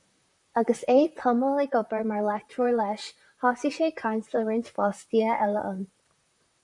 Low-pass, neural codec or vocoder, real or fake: 10.8 kHz; vocoder, 44.1 kHz, 128 mel bands, Pupu-Vocoder; fake